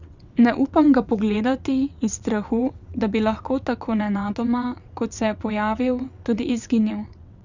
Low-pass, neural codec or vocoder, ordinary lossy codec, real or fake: 7.2 kHz; vocoder, 22.05 kHz, 80 mel bands, WaveNeXt; none; fake